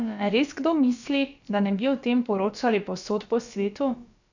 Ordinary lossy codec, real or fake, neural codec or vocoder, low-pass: none; fake; codec, 16 kHz, about 1 kbps, DyCAST, with the encoder's durations; 7.2 kHz